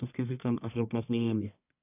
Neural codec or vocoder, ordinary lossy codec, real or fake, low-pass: codec, 44.1 kHz, 1.7 kbps, Pupu-Codec; none; fake; 3.6 kHz